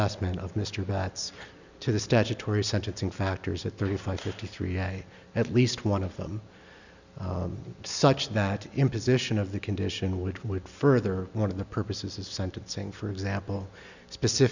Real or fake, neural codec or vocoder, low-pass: real; none; 7.2 kHz